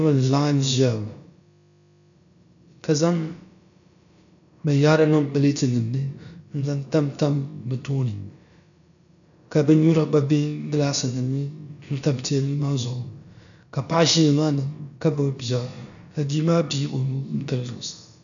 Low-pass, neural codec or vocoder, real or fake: 7.2 kHz; codec, 16 kHz, about 1 kbps, DyCAST, with the encoder's durations; fake